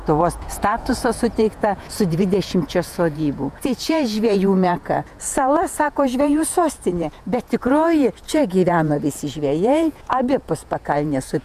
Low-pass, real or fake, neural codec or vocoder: 14.4 kHz; fake; vocoder, 48 kHz, 128 mel bands, Vocos